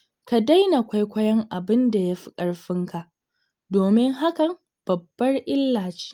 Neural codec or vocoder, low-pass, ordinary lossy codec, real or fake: none; 19.8 kHz; Opus, 32 kbps; real